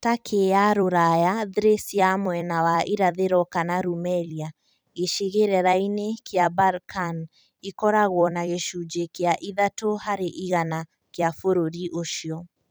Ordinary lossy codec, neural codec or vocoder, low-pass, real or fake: none; vocoder, 44.1 kHz, 128 mel bands every 256 samples, BigVGAN v2; none; fake